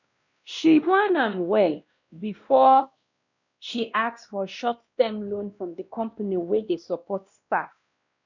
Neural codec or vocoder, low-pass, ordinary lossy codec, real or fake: codec, 16 kHz, 1 kbps, X-Codec, WavLM features, trained on Multilingual LibriSpeech; 7.2 kHz; none; fake